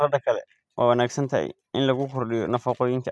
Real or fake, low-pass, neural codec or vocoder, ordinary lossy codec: real; none; none; none